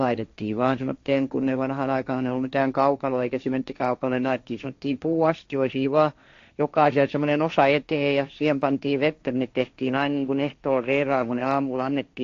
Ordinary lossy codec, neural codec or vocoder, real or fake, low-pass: AAC, 48 kbps; codec, 16 kHz, 1.1 kbps, Voila-Tokenizer; fake; 7.2 kHz